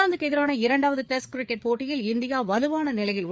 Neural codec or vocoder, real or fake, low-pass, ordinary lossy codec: codec, 16 kHz, 4 kbps, FreqCodec, larger model; fake; none; none